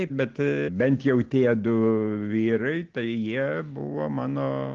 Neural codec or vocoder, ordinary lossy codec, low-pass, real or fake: none; Opus, 16 kbps; 7.2 kHz; real